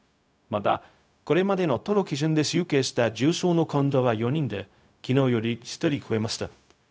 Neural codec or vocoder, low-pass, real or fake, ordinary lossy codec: codec, 16 kHz, 0.4 kbps, LongCat-Audio-Codec; none; fake; none